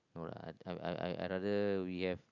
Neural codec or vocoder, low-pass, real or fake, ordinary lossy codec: none; 7.2 kHz; real; none